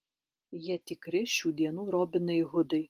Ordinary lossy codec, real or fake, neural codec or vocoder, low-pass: Opus, 24 kbps; real; none; 14.4 kHz